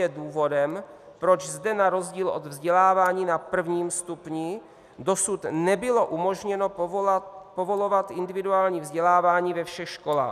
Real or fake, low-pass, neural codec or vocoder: real; 14.4 kHz; none